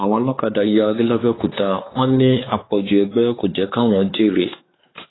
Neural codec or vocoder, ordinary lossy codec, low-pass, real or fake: codec, 16 kHz, 4 kbps, X-Codec, WavLM features, trained on Multilingual LibriSpeech; AAC, 16 kbps; 7.2 kHz; fake